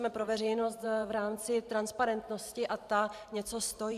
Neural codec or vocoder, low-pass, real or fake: vocoder, 44.1 kHz, 128 mel bands every 512 samples, BigVGAN v2; 14.4 kHz; fake